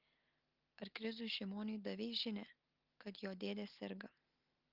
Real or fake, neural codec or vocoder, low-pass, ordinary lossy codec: real; none; 5.4 kHz; Opus, 32 kbps